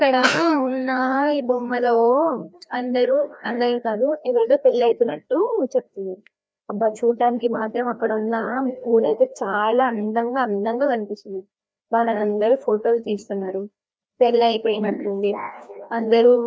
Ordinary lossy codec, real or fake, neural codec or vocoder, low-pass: none; fake; codec, 16 kHz, 1 kbps, FreqCodec, larger model; none